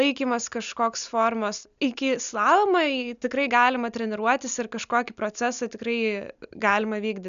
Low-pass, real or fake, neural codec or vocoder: 7.2 kHz; real; none